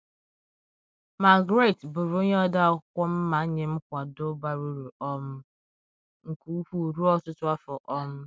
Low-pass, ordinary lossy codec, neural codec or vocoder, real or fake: none; none; none; real